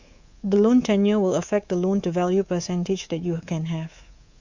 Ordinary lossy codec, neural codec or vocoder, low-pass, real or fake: Opus, 64 kbps; codec, 24 kHz, 3.1 kbps, DualCodec; 7.2 kHz; fake